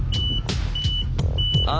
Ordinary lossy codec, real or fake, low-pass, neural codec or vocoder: none; real; none; none